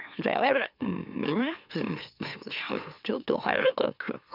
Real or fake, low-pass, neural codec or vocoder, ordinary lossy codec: fake; 5.4 kHz; autoencoder, 44.1 kHz, a latent of 192 numbers a frame, MeloTTS; none